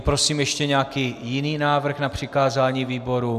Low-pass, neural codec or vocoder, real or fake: 14.4 kHz; none; real